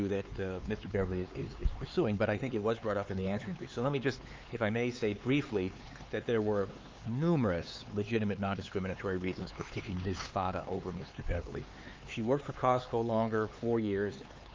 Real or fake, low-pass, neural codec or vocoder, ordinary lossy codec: fake; 7.2 kHz; codec, 16 kHz, 4 kbps, X-Codec, HuBERT features, trained on LibriSpeech; Opus, 32 kbps